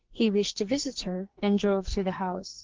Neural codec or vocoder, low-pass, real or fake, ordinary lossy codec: codec, 44.1 kHz, 2.6 kbps, SNAC; 7.2 kHz; fake; Opus, 16 kbps